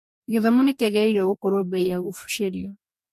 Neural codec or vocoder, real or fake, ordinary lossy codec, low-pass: codec, 44.1 kHz, 2.6 kbps, DAC; fake; MP3, 64 kbps; 14.4 kHz